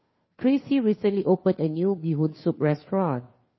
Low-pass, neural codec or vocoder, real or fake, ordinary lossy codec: 7.2 kHz; codec, 16 kHz, 2 kbps, FunCodec, trained on Chinese and English, 25 frames a second; fake; MP3, 24 kbps